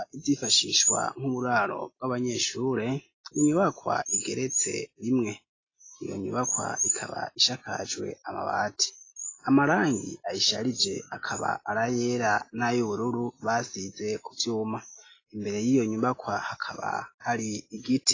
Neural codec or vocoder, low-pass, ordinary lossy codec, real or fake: none; 7.2 kHz; AAC, 32 kbps; real